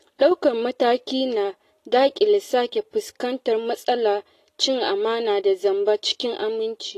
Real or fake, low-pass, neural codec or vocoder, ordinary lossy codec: real; 14.4 kHz; none; AAC, 48 kbps